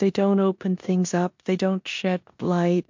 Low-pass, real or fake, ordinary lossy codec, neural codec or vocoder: 7.2 kHz; fake; MP3, 48 kbps; codec, 16 kHz in and 24 kHz out, 0.9 kbps, LongCat-Audio-Codec, four codebook decoder